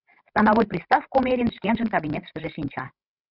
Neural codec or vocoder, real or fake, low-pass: codec, 16 kHz, 16 kbps, FreqCodec, larger model; fake; 5.4 kHz